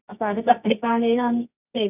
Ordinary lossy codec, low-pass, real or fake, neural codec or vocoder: none; 3.6 kHz; fake; codec, 24 kHz, 0.9 kbps, WavTokenizer, medium music audio release